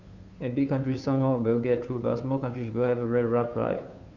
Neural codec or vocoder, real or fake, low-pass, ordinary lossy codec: codec, 16 kHz, 2 kbps, FunCodec, trained on Chinese and English, 25 frames a second; fake; 7.2 kHz; Opus, 64 kbps